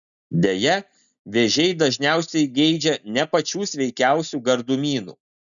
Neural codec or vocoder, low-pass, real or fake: none; 7.2 kHz; real